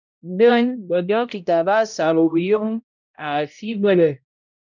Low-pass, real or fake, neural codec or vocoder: 7.2 kHz; fake; codec, 16 kHz, 0.5 kbps, X-Codec, HuBERT features, trained on balanced general audio